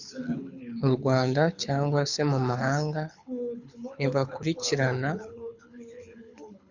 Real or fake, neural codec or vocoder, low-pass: fake; codec, 24 kHz, 6 kbps, HILCodec; 7.2 kHz